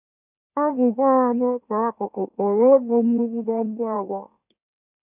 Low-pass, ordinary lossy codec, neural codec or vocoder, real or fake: 3.6 kHz; none; autoencoder, 44.1 kHz, a latent of 192 numbers a frame, MeloTTS; fake